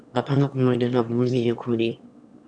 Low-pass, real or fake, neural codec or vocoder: 9.9 kHz; fake; autoencoder, 22.05 kHz, a latent of 192 numbers a frame, VITS, trained on one speaker